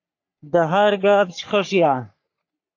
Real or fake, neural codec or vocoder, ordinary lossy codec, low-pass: fake; codec, 44.1 kHz, 3.4 kbps, Pupu-Codec; AAC, 48 kbps; 7.2 kHz